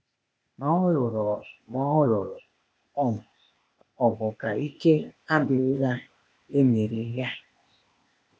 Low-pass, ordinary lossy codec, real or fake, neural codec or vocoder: none; none; fake; codec, 16 kHz, 0.8 kbps, ZipCodec